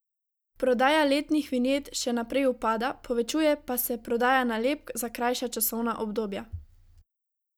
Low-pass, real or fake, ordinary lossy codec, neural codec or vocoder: none; real; none; none